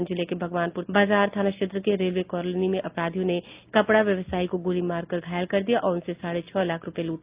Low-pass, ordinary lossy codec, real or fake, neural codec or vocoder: 3.6 kHz; Opus, 24 kbps; real; none